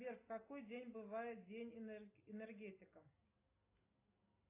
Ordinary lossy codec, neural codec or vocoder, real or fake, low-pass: AAC, 24 kbps; none; real; 3.6 kHz